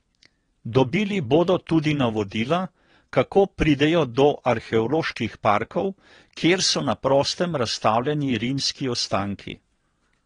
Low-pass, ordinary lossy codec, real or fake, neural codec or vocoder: 9.9 kHz; AAC, 32 kbps; fake; vocoder, 22.05 kHz, 80 mel bands, WaveNeXt